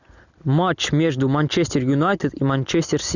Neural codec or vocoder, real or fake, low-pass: none; real; 7.2 kHz